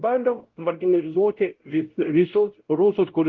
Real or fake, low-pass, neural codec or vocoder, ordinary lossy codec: fake; 7.2 kHz; codec, 16 kHz, 0.5 kbps, X-Codec, WavLM features, trained on Multilingual LibriSpeech; Opus, 16 kbps